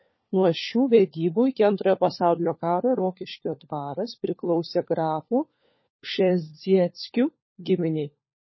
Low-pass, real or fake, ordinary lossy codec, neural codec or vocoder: 7.2 kHz; fake; MP3, 24 kbps; codec, 16 kHz, 4 kbps, FunCodec, trained on LibriTTS, 50 frames a second